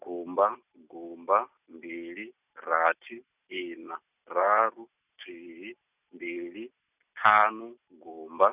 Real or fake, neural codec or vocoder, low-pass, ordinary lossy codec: real; none; 3.6 kHz; none